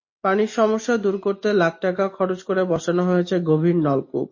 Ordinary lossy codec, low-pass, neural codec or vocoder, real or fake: MP3, 32 kbps; 7.2 kHz; none; real